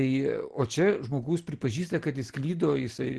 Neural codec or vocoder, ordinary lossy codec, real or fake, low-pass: none; Opus, 16 kbps; real; 10.8 kHz